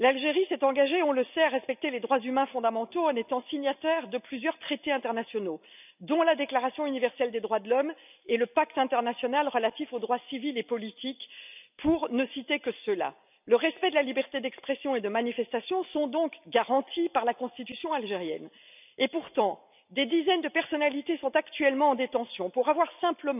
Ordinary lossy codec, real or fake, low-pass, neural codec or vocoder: none; real; 3.6 kHz; none